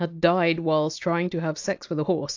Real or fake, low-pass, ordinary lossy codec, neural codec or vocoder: real; 7.2 kHz; AAC, 48 kbps; none